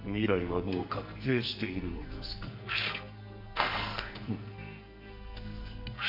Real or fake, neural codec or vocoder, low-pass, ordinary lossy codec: fake; codec, 44.1 kHz, 2.6 kbps, SNAC; 5.4 kHz; none